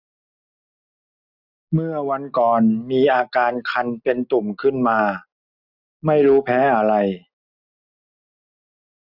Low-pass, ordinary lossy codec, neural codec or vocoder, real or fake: 5.4 kHz; none; none; real